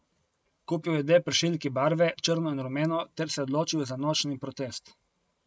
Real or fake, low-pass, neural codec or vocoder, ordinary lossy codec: real; none; none; none